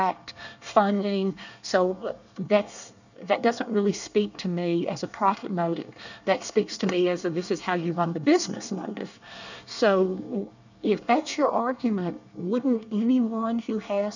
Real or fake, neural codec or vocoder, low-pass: fake; codec, 24 kHz, 1 kbps, SNAC; 7.2 kHz